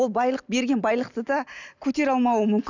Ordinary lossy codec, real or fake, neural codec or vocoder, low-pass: none; real; none; 7.2 kHz